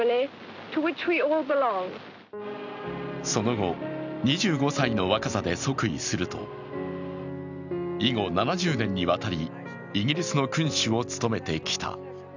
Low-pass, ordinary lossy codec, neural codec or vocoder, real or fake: 7.2 kHz; none; none; real